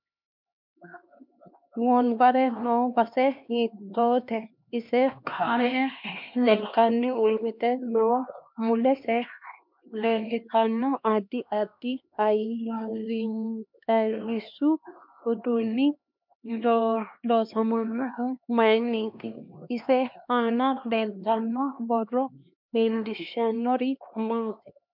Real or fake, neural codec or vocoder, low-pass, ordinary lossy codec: fake; codec, 16 kHz, 2 kbps, X-Codec, HuBERT features, trained on LibriSpeech; 5.4 kHz; MP3, 48 kbps